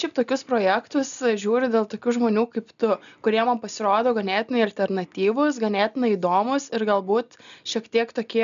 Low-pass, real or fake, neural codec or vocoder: 7.2 kHz; real; none